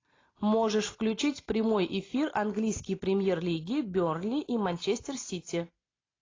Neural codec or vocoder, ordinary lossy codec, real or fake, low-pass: none; AAC, 32 kbps; real; 7.2 kHz